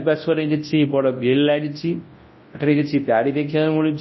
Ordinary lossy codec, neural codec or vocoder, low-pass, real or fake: MP3, 24 kbps; codec, 24 kHz, 0.9 kbps, WavTokenizer, large speech release; 7.2 kHz; fake